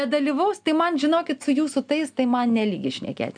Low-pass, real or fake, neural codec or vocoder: 9.9 kHz; real; none